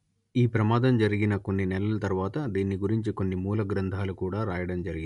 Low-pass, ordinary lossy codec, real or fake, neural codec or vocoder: 10.8 kHz; MP3, 64 kbps; real; none